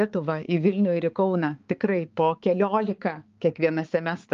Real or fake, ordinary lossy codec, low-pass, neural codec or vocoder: fake; Opus, 32 kbps; 7.2 kHz; codec, 16 kHz, 4 kbps, X-Codec, HuBERT features, trained on balanced general audio